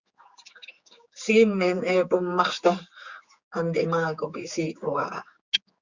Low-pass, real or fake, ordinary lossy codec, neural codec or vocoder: 7.2 kHz; fake; Opus, 64 kbps; codec, 16 kHz, 4 kbps, X-Codec, HuBERT features, trained on general audio